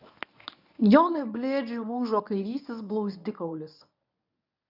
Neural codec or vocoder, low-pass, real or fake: codec, 24 kHz, 0.9 kbps, WavTokenizer, medium speech release version 1; 5.4 kHz; fake